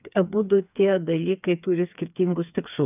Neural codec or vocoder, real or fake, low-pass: codec, 16 kHz, 4 kbps, FreqCodec, smaller model; fake; 3.6 kHz